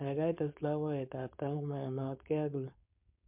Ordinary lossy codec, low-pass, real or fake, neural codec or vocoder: MP3, 32 kbps; 3.6 kHz; fake; codec, 16 kHz, 4.8 kbps, FACodec